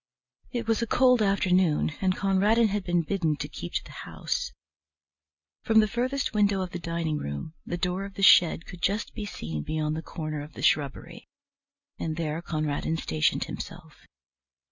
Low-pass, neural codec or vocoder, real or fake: 7.2 kHz; none; real